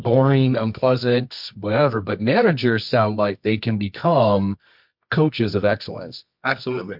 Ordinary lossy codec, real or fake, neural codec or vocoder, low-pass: MP3, 48 kbps; fake; codec, 24 kHz, 0.9 kbps, WavTokenizer, medium music audio release; 5.4 kHz